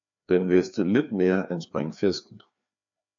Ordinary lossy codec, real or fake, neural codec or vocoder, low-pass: AAC, 64 kbps; fake; codec, 16 kHz, 2 kbps, FreqCodec, larger model; 7.2 kHz